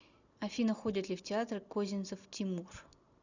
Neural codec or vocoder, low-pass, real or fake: none; 7.2 kHz; real